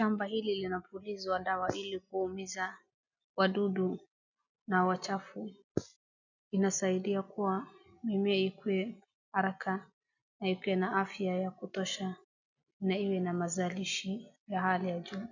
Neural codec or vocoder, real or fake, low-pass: none; real; 7.2 kHz